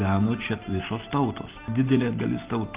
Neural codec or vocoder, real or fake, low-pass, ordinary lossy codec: none; real; 3.6 kHz; Opus, 24 kbps